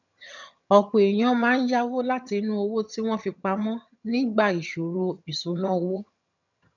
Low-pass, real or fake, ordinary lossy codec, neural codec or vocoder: 7.2 kHz; fake; none; vocoder, 22.05 kHz, 80 mel bands, HiFi-GAN